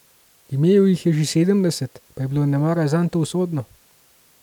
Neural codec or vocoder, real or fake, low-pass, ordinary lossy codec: none; real; 19.8 kHz; none